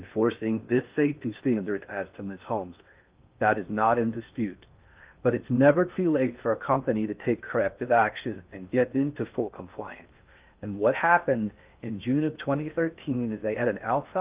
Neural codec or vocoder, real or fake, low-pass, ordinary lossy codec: codec, 16 kHz in and 24 kHz out, 0.6 kbps, FocalCodec, streaming, 4096 codes; fake; 3.6 kHz; Opus, 24 kbps